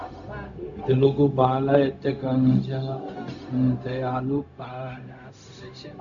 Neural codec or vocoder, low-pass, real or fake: codec, 16 kHz, 0.4 kbps, LongCat-Audio-Codec; 7.2 kHz; fake